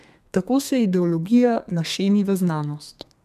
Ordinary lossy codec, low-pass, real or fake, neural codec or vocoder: MP3, 96 kbps; 14.4 kHz; fake; codec, 32 kHz, 1.9 kbps, SNAC